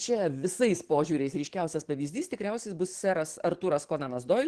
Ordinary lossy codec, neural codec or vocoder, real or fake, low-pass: Opus, 16 kbps; codec, 44.1 kHz, 7.8 kbps, DAC; fake; 10.8 kHz